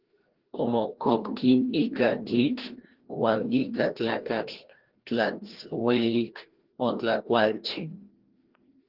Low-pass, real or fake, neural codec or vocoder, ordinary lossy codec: 5.4 kHz; fake; codec, 16 kHz, 1 kbps, FreqCodec, larger model; Opus, 16 kbps